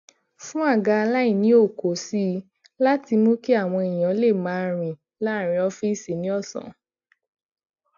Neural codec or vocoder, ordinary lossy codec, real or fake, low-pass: none; AAC, 64 kbps; real; 7.2 kHz